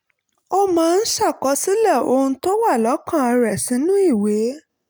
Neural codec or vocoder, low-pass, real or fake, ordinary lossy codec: none; none; real; none